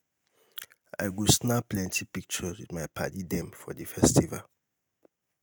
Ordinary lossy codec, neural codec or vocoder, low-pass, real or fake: none; none; none; real